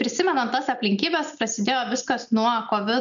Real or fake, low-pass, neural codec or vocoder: real; 7.2 kHz; none